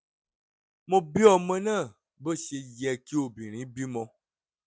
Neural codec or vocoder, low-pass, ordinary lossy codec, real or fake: none; none; none; real